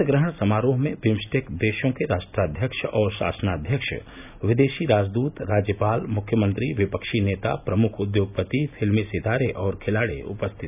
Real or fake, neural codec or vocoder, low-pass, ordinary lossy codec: real; none; 3.6 kHz; none